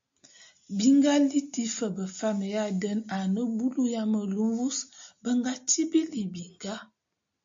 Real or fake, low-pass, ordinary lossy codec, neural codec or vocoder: real; 7.2 kHz; MP3, 48 kbps; none